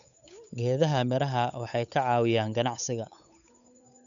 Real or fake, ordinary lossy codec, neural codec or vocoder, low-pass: fake; none; codec, 16 kHz, 8 kbps, FunCodec, trained on Chinese and English, 25 frames a second; 7.2 kHz